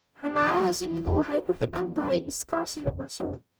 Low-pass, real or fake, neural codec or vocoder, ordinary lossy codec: none; fake; codec, 44.1 kHz, 0.9 kbps, DAC; none